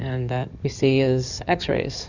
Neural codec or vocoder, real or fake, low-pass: codec, 16 kHz in and 24 kHz out, 2.2 kbps, FireRedTTS-2 codec; fake; 7.2 kHz